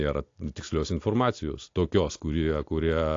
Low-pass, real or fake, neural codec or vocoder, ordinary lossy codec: 7.2 kHz; real; none; AAC, 48 kbps